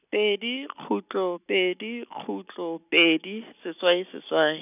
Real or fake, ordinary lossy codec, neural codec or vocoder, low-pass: real; none; none; 3.6 kHz